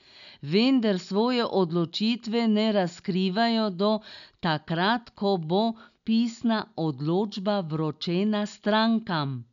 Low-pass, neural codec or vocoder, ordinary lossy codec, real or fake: 7.2 kHz; none; none; real